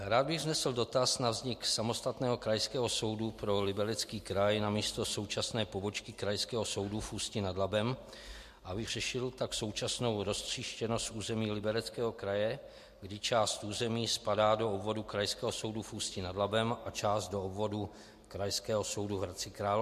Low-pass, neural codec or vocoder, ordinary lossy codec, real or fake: 14.4 kHz; none; MP3, 64 kbps; real